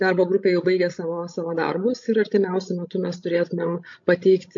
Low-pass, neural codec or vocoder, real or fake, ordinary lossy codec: 7.2 kHz; codec, 16 kHz, 16 kbps, FreqCodec, larger model; fake; MP3, 48 kbps